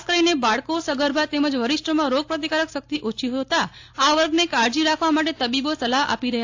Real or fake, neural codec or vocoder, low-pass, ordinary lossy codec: real; none; 7.2 kHz; AAC, 48 kbps